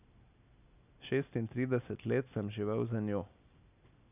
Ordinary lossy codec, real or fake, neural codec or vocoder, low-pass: none; real; none; 3.6 kHz